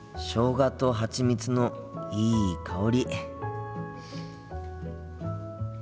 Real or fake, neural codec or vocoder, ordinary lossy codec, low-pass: real; none; none; none